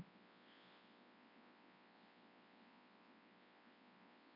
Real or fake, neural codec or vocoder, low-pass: fake; codec, 24 kHz, 0.9 kbps, WavTokenizer, large speech release; 5.4 kHz